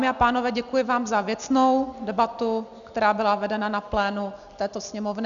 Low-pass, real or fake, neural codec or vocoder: 7.2 kHz; real; none